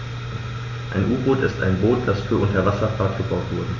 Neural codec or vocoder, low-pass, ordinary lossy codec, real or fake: none; 7.2 kHz; AAC, 48 kbps; real